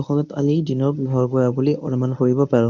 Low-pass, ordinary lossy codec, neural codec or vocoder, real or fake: 7.2 kHz; none; codec, 24 kHz, 0.9 kbps, WavTokenizer, medium speech release version 1; fake